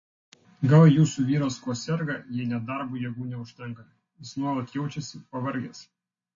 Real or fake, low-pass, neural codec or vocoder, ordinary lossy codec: real; 7.2 kHz; none; MP3, 32 kbps